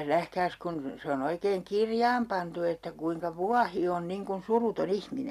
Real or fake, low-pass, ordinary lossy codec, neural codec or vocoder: real; 14.4 kHz; none; none